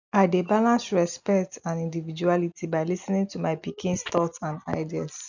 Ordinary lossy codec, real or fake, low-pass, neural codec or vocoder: none; real; 7.2 kHz; none